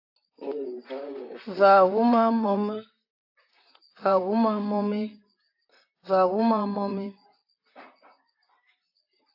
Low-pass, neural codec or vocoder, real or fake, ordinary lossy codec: 5.4 kHz; vocoder, 44.1 kHz, 128 mel bands, Pupu-Vocoder; fake; AAC, 32 kbps